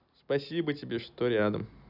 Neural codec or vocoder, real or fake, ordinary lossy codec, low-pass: none; real; none; 5.4 kHz